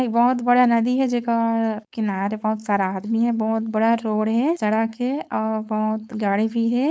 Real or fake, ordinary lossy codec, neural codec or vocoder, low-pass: fake; none; codec, 16 kHz, 4.8 kbps, FACodec; none